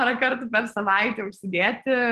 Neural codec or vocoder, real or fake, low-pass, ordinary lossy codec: none; real; 14.4 kHz; Opus, 16 kbps